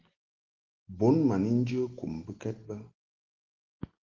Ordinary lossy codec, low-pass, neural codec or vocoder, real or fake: Opus, 16 kbps; 7.2 kHz; none; real